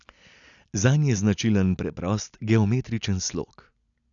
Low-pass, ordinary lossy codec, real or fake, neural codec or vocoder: 7.2 kHz; none; real; none